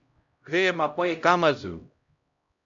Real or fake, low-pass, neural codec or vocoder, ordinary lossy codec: fake; 7.2 kHz; codec, 16 kHz, 0.5 kbps, X-Codec, HuBERT features, trained on LibriSpeech; MP3, 64 kbps